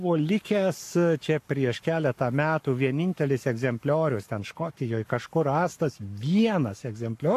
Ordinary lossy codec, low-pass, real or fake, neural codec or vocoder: AAC, 64 kbps; 14.4 kHz; fake; codec, 44.1 kHz, 7.8 kbps, Pupu-Codec